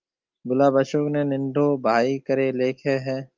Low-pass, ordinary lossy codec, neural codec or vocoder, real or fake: 7.2 kHz; Opus, 24 kbps; none; real